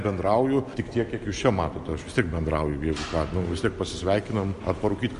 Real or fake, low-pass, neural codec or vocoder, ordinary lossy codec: real; 10.8 kHz; none; AAC, 96 kbps